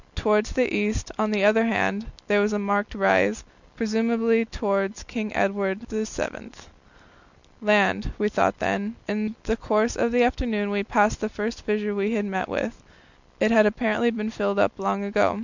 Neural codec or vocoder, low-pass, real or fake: none; 7.2 kHz; real